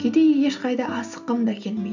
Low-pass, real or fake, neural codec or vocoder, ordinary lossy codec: 7.2 kHz; real; none; none